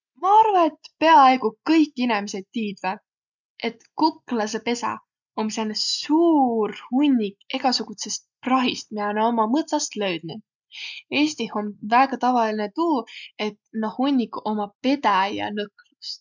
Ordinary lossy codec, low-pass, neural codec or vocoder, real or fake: none; 7.2 kHz; none; real